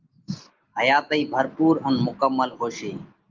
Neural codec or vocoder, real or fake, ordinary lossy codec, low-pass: none; real; Opus, 32 kbps; 7.2 kHz